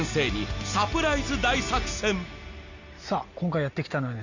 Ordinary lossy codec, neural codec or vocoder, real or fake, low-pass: none; none; real; 7.2 kHz